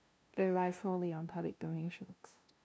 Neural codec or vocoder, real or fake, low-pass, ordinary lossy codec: codec, 16 kHz, 0.5 kbps, FunCodec, trained on LibriTTS, 25 frames a second; fake; none; none